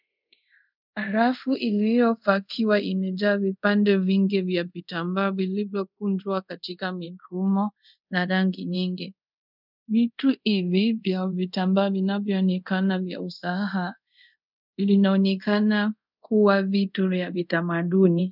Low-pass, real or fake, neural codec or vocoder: 5.4 kHz; fake; codec, 24 kHz, 0.5 kbps, DualCodec